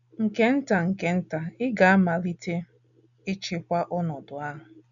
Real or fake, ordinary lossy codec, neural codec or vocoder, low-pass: real; none; none; 7.2 kHz